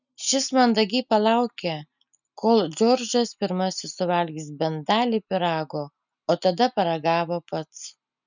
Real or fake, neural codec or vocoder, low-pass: real; none; 7.2 kHz